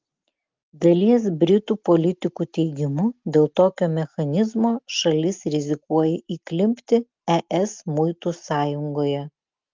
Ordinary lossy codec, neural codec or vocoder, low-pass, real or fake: Opus, 32 kbps; none; 7.2 kHz; real